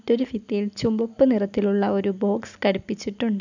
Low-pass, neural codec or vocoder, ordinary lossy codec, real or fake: 7.2 kHz; none; none; real